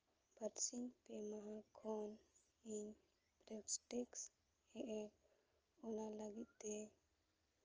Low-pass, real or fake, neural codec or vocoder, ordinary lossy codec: 7.2 kHz; real; none; Opus, 24 kbps